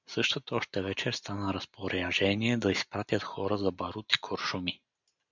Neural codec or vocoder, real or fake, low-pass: none; real; 7.2 kHz